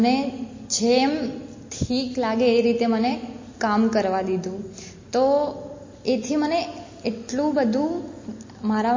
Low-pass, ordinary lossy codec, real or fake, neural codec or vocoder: 7.2 kHz; MP3, 32 kbps; real; none